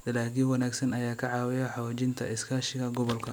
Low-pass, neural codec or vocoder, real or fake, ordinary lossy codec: none; none; real; none